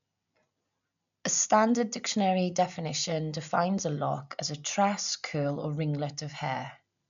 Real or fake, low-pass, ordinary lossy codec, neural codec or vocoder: real; 7.2 kHz; none; none